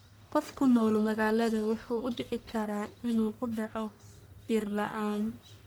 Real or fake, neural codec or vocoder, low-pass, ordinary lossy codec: fake; codec, 44.1 kHz, 1.7 kbps, Pupu-Codec; none; none